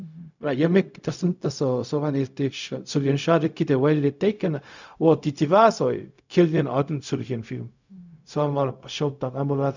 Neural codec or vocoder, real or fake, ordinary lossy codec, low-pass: codec, 16 kHz, 0.4 kbps, LongCat-Audio-Codec; fake; none; 7.2 kHz